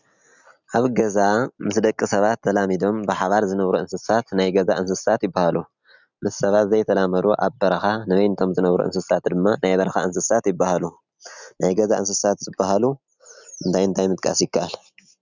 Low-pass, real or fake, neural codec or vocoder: 7.2 kHz; real; none